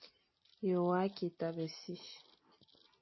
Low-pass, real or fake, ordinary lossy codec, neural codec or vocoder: 7.2 kHz; real; MP3, 24 kbps; none